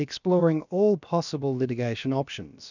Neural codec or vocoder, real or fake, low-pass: codec, 16 kHz, about 1 kbps, DyCAST, with the encoder's durations; fake; 7.2 kHz